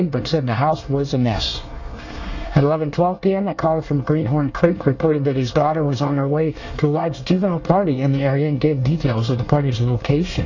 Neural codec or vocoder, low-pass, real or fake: codec, 24 kHz, 1 kbps, SNAC; 7.2 kHz; fake